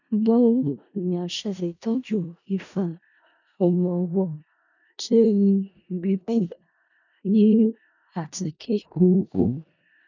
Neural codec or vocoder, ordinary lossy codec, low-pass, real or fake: codec, 16 kHz in and 24 kHz out, 0.4 kbps, LongCat-Audio-Codec, four codebook decoder; none; 7.2 kHz; fake